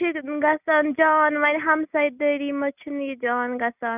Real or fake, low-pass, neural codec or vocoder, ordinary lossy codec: real; 3.6 kHz; none; none